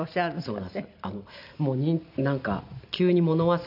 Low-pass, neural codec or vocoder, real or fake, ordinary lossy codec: 5.4 kHz; none; real; none